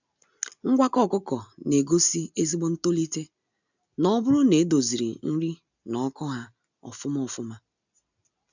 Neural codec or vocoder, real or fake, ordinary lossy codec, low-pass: none; real; none; 7.2 kHz